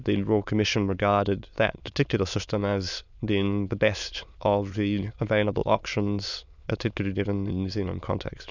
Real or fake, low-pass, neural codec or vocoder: fake; 7.2 kHz; autoencoder, 22.05 kHz, a latent of 192 numbers a frame, VITS, trained on many speakers